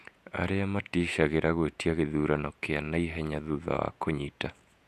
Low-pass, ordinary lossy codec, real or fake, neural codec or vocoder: 14.4 kHz; none; fake; vocoder, 48 kHz, 128 mel bands, Vocos